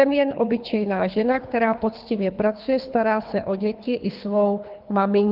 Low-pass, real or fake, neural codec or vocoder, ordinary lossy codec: 5.4 kHz; fake; codec, 24 kHz, 3 kbps, HILCodec; Opus, 32 kbps